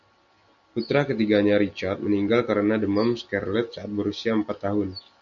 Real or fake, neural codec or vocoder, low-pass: real; none; 7.2 kHz